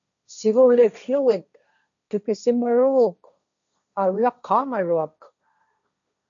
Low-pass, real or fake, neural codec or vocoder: 7.2 kHz; fake; codec, 16 kHz, 1.1 kbps, Voila-Tokenizer